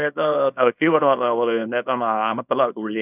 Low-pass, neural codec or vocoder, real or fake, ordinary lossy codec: 3.6 kHz; codec, 24 kHz, 0.9 kbps, WavTokenizer, small release; fake; none